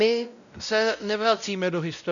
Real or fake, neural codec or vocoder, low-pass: fake; codec, 16 kHz, 0.5 kbps, X-Codec, WavLM features, trained on Multilingual LibriSpeech; 7.2 kHz